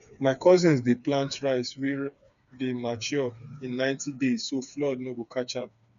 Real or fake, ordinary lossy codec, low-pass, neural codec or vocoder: fake; none; 7.2 kHz; codec, 16 kHz, 4 kbps, FreqCodec, smaller model